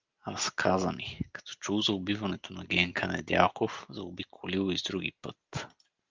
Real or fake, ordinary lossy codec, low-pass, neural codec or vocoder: real; Opus, 32 kbps; 7.2 kHz; none